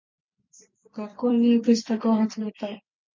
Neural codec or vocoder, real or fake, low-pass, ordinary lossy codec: vocoder, 44.1 kHz, 128 mel bands every 256 samples, BigVGAN v2; fake; 7.2 kHz; MP3, 48 kbps